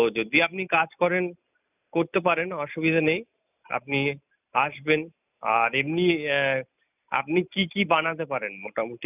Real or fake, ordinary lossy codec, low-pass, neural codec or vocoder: real; none; 3.6 kHz; none